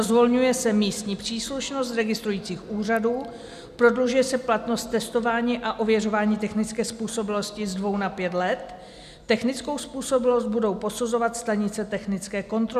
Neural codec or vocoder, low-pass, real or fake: none; 14.4 kHz; real